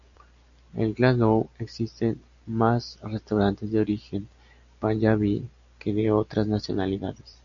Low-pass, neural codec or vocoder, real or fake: 7.2 kHz; none; real